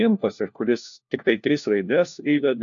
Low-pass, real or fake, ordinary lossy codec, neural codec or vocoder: 7.2 kHz; fake; AAC, 64 kbps; codec, 16 kHz, 1 kbps, FunCodec, trained on LibriTTS, 50 frames a second